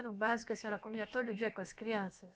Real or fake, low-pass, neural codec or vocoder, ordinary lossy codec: fake; none; codec, 16 kHz, about 1 kbps, DyCAST, with the encoder's durations; none